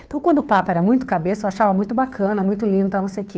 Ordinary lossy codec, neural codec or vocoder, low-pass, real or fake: none; codec, 16 kHz, 2 kbps, FunCodec, trained on Chinese and English, 25 frames a second; none; fake